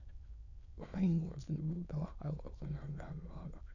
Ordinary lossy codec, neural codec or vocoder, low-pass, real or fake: none; autoencoder, 22.05 kHz, a latent of 192 numbers a frame, VITS, trained on many speakers; 7.2 kHz; fake